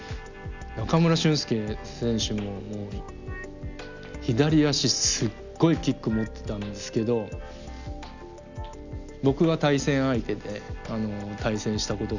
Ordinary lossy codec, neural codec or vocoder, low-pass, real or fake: none; none; 7.2 kHz; real